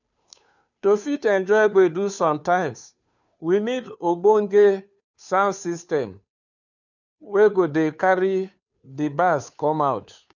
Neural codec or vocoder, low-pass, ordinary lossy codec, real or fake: codec, 16 kHz, 2 kbps, FunCodec, trained on Chinese and English, 25 frames a second; 7.2 kHz; none; fake